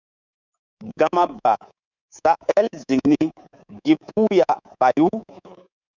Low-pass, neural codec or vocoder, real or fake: 7.2 kHz; codec, 24 kHz, 3.1 kbps, DualCodec; fake